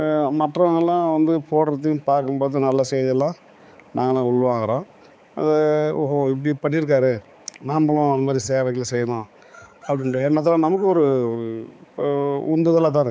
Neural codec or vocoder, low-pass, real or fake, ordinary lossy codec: codec, 16 kHz, 4 kbps, X-Codec, HuBERT features, trained on balanced general audio; none; fake; none